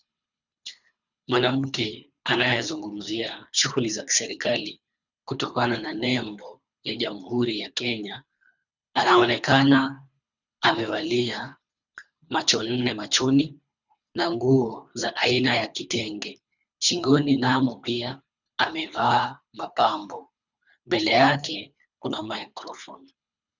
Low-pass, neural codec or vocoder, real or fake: 7.2 kHz; codec, 24 kHz, 3 kbps, HILCodec; fake